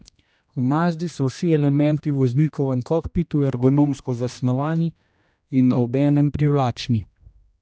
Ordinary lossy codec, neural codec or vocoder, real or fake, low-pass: none; codec, 16 kHz, 1 kbps, X-Codec, HuBERT features, trained on general audio; fake; none